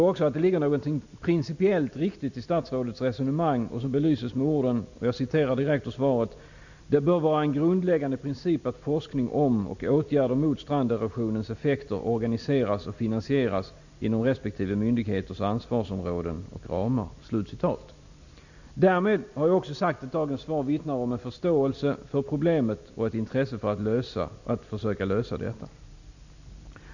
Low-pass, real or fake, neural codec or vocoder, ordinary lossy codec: 7.2 kHz; real; none; none